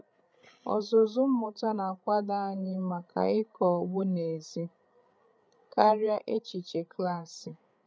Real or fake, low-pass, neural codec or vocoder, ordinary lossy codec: fake; none; codec, 16 kHz, 8 kbps, FreqCodec, larger model; none